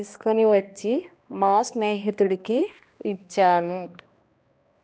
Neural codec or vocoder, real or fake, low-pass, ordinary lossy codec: codec, 16 kHz, 1 kbps, X-Codec, HuBERT features, trained on balanced general audio; fake; none; none